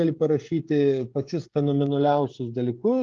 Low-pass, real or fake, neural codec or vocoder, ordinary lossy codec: 7.2 kHz; fake; codec, 16 kHz, 16 kbps, FreqCodec, smaller model; Opus, 32 kbps